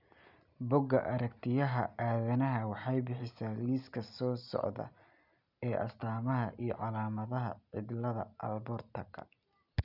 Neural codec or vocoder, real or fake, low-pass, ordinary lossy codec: none; real; 5.4 kHz; none